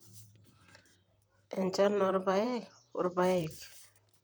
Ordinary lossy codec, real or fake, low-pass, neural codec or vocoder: none; fake; none; vocoder, 44.1 kHz, 128 mel bands, Pupu-Vocoder